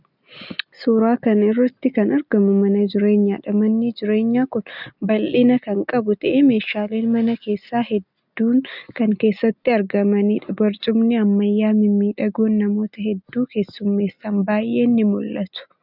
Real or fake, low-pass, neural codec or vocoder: real; 5.4 kHz; none